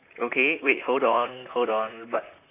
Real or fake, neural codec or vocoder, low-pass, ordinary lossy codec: fake; vocoder, 44.1 kHz, 128 mel bands, Pupu-Vocoder; 3.6 kHz; none